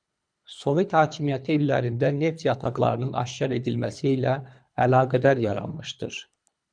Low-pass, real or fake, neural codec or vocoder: 9.9 kHz; fake; codec, 24 kHz, 3 kbps, HILCodec